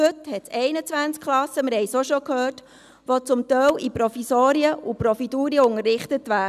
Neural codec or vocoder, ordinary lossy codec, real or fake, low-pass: none; none; real; 14.4 kHz